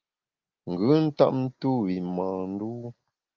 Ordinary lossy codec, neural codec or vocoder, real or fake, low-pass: Opus, 24 kbps; none; real; 7.2 kHz